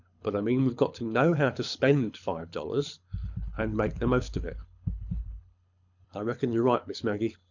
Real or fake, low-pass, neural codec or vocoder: fake; 7.2 kHz; codec, 24 kHz, 6 kbps, HILCodec